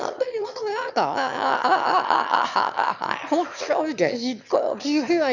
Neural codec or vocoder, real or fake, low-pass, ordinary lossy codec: autoencoder, 22.05 kHz, a latent of 192 numbers a frame, VITS, trained on one speaker; fake; 7.2 kHz; none